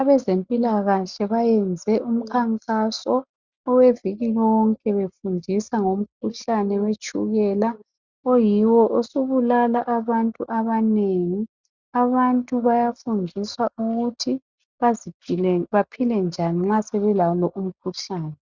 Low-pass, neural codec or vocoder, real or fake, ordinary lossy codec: 7.2 kHz; none; real; Opus, 64 kbps